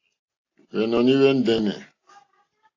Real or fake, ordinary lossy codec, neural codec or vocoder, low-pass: real; AAC, 32 kbps; none; 7.2 kHz